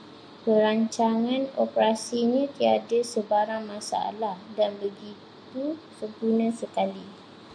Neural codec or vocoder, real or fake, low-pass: none; real; 9.9 kHz